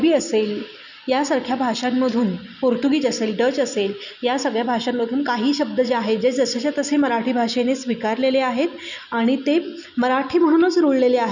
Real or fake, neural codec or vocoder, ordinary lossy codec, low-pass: real; none; none; 7.2 kHz